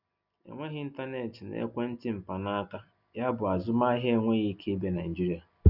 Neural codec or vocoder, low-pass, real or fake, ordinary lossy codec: none; 5.4 kHz; real; none